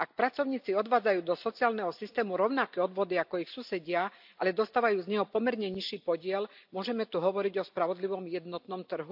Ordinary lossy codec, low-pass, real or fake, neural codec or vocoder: AAC, 48 kbps; 5.4 kHz; real; none